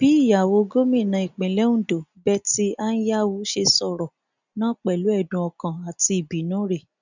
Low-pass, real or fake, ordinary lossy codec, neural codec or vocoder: 7.2 kHz; real; none; none